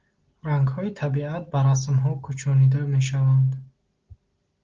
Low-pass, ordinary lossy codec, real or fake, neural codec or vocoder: 7.2 kHz; Opus, 16 kbps; real; none